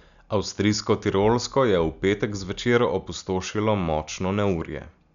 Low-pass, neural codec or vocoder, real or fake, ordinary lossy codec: 7.2 kHz; none; real; none